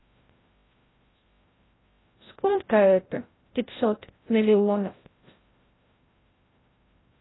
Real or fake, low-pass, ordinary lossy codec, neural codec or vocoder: fake; 7.2 kHz; AAC, 16 kbps; codec, 16 kHz, 0.5 kbps, FreqCodec, larger model